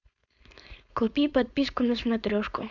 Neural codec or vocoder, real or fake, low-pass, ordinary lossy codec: codec, 16 kHz, 4.8 kbps, FACodec; fake; 7.2 kHz; Opus, 64 kbps